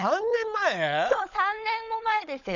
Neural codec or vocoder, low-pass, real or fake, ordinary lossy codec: codec, 16 kHz, 16 kbps, FunCodec, trained on LibriTTS, 50 frames a second; 7.2 kHz; fake; none